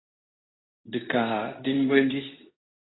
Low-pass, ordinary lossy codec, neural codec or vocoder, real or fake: 7.2 kHz; AAC, 16 kbps; codec, 24 kHz, 0.9 kbps, WavTokenizer, medium speech release version 2; fake